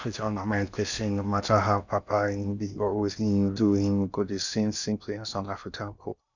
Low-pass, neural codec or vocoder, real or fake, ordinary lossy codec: 7.2 kHz; codec, 16 kHz in and 24 kHz out, 0.6 kbps, FocalCodec, streaming, 2048 codes; fake; none